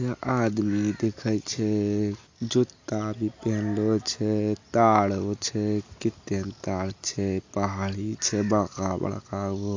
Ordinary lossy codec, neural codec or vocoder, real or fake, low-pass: AAC, 48 kbps; none; real; 7.2 kHz